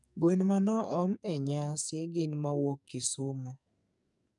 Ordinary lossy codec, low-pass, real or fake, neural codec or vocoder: none; 10.8 kHz; fake; codec, 44.1 kHz, 2.6 kbps, SNAC